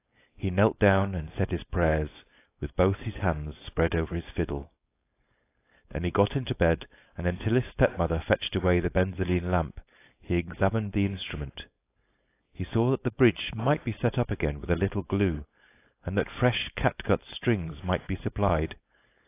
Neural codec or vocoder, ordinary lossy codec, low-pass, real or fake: codec, 16 kHz, 4.8 kbps, FACodec; AAC, 24 kbps; 3.6 kHz; fake